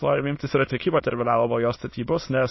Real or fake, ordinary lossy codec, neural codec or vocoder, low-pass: fake; MP3, 24 kbps; autoencoder, 22.05 kHz, a latent of 192 numbers a frame, VITS, trained on many speakers; 7.2 kHz